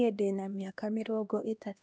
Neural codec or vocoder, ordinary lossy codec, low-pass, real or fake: codec, 16 kHz, 1 kbps, X-Codec, HuBERT features, trained on LibriSpeech; none; none; fake